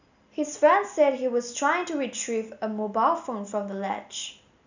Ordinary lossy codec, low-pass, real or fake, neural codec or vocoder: none; 7.2 kHz; real; none